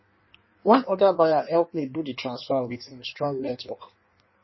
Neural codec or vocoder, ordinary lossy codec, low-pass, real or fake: codec, 16 kHz in and 24 kHz out, 1.1 kbps, FireRedTTS-2 codec; MP3, 24 kbps; 7.2 kHz; fake